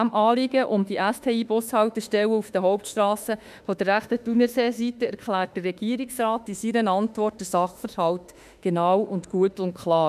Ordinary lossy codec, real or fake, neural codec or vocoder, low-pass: none; fake; autoencoder, 48 kHz, 32 numbers a frame, DAC-VAE, trained on Japanese speech; 14.4 kHz